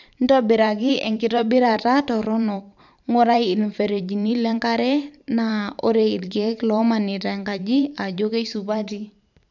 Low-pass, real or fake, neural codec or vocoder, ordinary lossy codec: 7.2 kHz; fake; vocoder, 44.1 kHz, 128 mel bands every 512 samples, BigVGAN v2; none